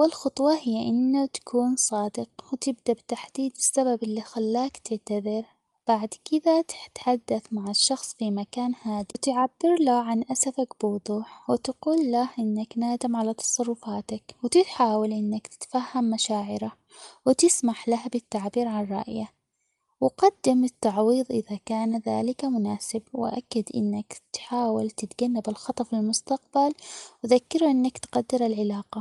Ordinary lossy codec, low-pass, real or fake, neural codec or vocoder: Opus, 32 kbps; 10.8 kHz; real; none